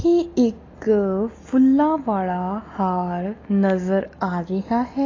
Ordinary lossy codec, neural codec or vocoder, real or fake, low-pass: AAC, 32 kbps; none; real; 7.2 kHz